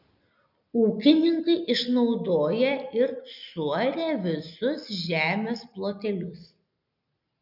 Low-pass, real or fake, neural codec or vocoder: 5.4 kHz; real; none